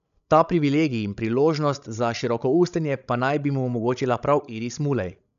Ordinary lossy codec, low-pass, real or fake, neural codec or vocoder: none; 7.2 kHz; fake; codec, 16 kHz, 16 kbps, FreqCodec, larger model